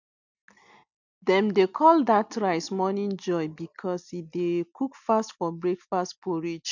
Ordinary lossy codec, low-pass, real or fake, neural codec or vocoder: none; 7.2 kHz; real; none